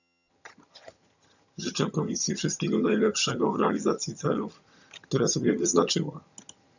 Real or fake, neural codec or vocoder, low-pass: fake; vocoder, 22.05 kHz, 80 mel bands, HiFi-GAN; 7.2 kHz